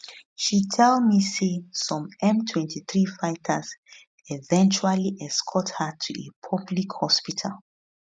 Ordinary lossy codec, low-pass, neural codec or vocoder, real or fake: none; none; none; real